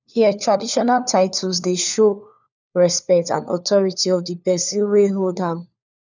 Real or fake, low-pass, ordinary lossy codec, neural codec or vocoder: fake; 7.2 kHz; none; codec, 16 kHz, 4 kbps, FunCodec, trained on LibriTTS, 50 frames a second